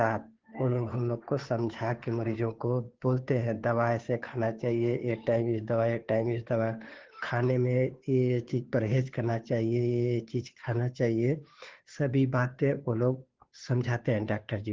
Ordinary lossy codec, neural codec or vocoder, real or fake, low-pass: Opus, 16 kbps; codec, 16 kHz, 2 kbps, FunCodec, trained on Chinese and English, 25 frames a second; fake; 7.2 kHz